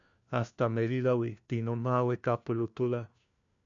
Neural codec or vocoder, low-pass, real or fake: codec, 16 kHz, 1 kbps, FunCodec, trained on LibriTTS, 50 frames a second; 7.2 kHz; fake